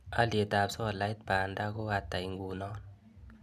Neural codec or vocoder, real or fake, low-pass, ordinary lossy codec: none; real; 14.4 kHz; none